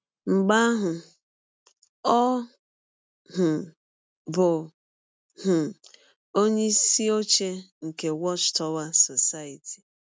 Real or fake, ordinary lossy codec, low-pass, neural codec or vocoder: real; none; none; none